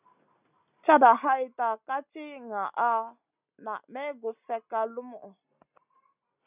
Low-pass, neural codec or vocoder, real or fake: 3.6 kHz; none; real